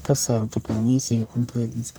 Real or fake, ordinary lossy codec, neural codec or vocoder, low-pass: fake; none; codec, 44.1 kHz, 1.7 kbps, Pupu-Codec; none